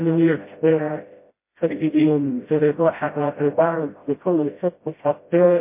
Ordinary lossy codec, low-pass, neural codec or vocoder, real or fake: MP3, 24 kbps; 3.6 kHz; codec, 16 kHz, 0.5 kbps, FreqCodec, smaller model; fake